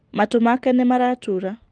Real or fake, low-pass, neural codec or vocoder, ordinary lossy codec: real; 9.9 kHz; none; Opus, 24 kbps